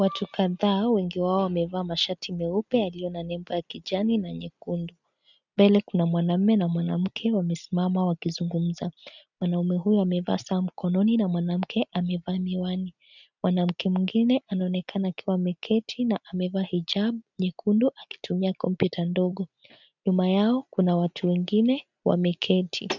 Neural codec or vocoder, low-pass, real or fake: none; 7.2 kHz; real